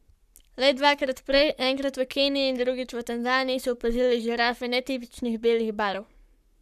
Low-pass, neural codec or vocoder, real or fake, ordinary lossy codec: 14.4 kHz; codec, 44.1 kHz, 7.8 kbps, Pupu-Codec; fake; none